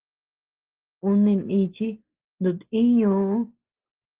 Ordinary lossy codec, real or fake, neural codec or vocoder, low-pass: Opus, 16 kbps; real; none; 3.6 kHz